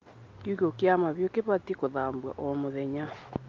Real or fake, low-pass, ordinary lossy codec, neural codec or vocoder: real; 7.2 kHz; Opus, 24 kbps; none